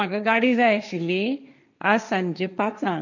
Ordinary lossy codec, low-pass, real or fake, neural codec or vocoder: none; 7.2 kHz; fake; codec, 16 kHz, 1.1 kbps, Voila-Tokenizer